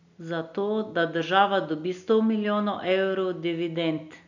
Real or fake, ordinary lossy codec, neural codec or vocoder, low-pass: real; none; none; 7.2 kHz